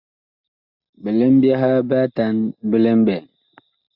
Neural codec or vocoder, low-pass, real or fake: none; 5.4 kHz; real